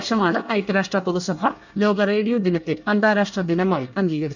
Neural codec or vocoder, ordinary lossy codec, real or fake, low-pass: codec, 24 kHz, 1 kbps, SNAC; none; fake; 7.2 kHz